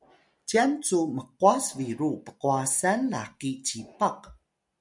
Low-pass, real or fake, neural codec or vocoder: 10.8 kHz; real; none